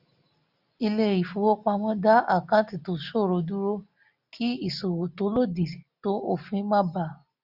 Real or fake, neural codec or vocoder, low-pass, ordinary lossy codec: real; none; 5.4 kHz; none